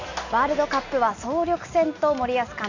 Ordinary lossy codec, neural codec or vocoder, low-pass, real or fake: none; none; 7.2 kHz; real